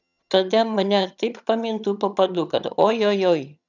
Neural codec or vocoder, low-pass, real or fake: vocoder, 22.05 kHz, 80 mel bands, HiFi-GAN; 7.2 kHz; fake